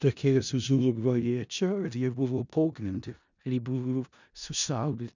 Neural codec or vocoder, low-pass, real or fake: codec, 16 kHz in and 24 kHz out, 0.4 kbps, LongCat-Audio-Codec, four codebook decoder; 7.2 kHz; fake